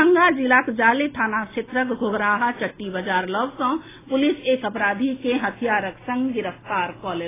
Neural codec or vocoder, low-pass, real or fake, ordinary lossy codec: none; 3.6 kHz; real; AAC, 16 kbps